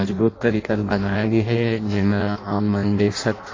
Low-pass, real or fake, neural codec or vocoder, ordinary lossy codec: 7.2 kHz; fake; codec, 16 kHz in and 24 kHz out, 0.6 kbps, FireRedTTS-2 codec; AAC, 32 kbps